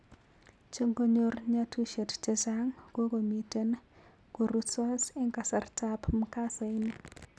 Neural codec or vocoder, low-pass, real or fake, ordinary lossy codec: none; none; real; none